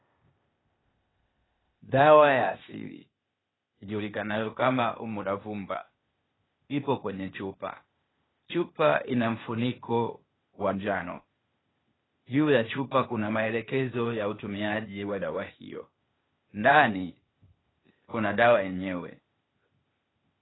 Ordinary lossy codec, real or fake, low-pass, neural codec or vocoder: AAC, 16 kbps; fake; 7.2 kHz; codec, 16 kHz, 0.8 kbps, ZipCodec